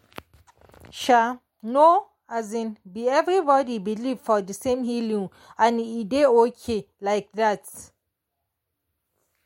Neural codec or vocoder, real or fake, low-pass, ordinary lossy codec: none; real; 19.8 kHz; MP3, 64 kbps